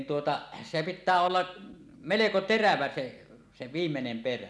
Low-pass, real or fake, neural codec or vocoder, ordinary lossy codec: none; real; none; none